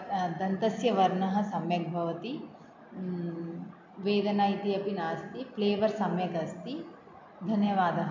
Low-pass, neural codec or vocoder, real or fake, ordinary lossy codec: 7.2 kHz; none; real; MP3, 64 kbps